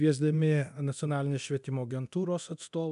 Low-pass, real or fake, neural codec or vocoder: 10.8 kHz; fake; codec, 24 kHz, 0.9 kbps, DualCodec